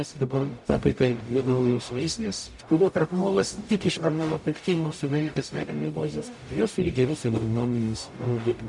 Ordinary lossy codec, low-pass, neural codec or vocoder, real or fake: MP3, 96 kbps; 10.8 kHz; codec, 44.1 kHz, 0.9 kbps, DAC; fake